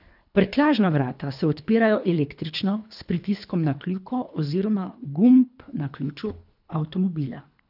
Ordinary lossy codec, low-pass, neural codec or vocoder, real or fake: none; 5.4 kHz; codec, 24 kHz, 3 kbps, HILCodec; fake